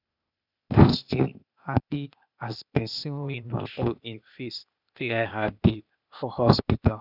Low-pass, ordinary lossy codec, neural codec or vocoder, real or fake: 5.4 kHz; none; codec, 16 kHz, 0.8 kbps, ZipCodec; fake